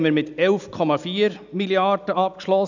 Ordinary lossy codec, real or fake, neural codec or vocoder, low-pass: none; real; none; 7.2 kHz